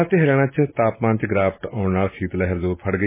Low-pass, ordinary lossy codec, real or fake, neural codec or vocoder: 3.6 kHz; MP3, 16 kbps; real; none